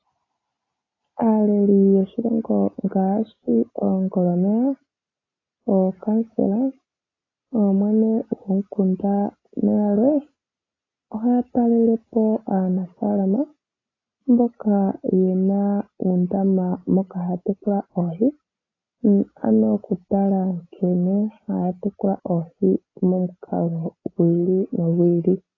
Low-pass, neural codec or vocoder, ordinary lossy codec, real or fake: 7.2 kHz; none; AAC, 32 kbps; real